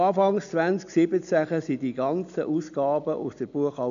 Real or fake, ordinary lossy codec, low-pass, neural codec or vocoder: real; none; 7.2 kHz; none